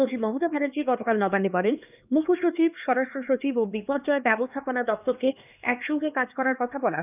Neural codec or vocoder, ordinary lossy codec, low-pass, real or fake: codec, 16 kHz, 2 kbps, X-Codec, HuBERT features, trained on LibriSpeech; none; 3.6 kHz; fake